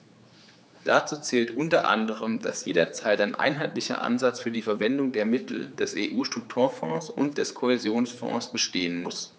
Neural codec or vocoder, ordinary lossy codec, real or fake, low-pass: codec, 16 kHz, 4 kbps, X-Codec, HuBERT features, trained on general audio; none; fake; none